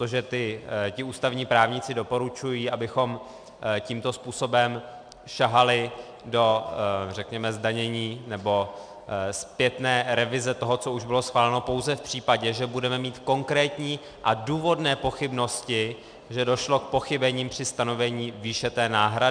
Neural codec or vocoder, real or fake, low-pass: none; real; 9.9 kHz